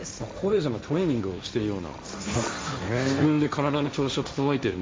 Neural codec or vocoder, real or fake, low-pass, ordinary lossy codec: codec, 16 kHz, 1.1 kbps, Voila-Tokenizer; fake; none; none